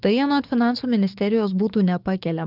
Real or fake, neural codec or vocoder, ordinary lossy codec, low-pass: fake; codec, 44.1 kHz, 7.8 kbps, DAC; Opus, 32 kbps; 5.4 kHz